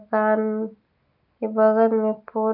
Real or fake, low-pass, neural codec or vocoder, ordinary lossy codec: real; 5.4 kHz; none; none